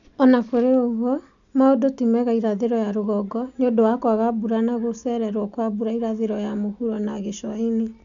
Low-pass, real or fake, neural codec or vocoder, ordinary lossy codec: 7.2 kHz; real; none; none